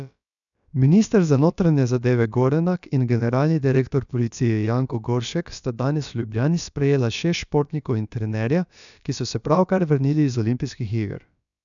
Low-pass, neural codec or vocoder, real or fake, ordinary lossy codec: 7.2 kHz; codec, 16 kHz, about 1 kbps, DyCAST, with the encoder's durations; fake; none